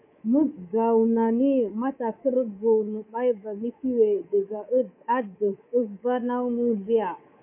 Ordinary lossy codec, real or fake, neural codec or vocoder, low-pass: MP3, 24 kbps; fake; codec, 24 kHz, 3.1 kbps, DualCodec; 3.6 kHz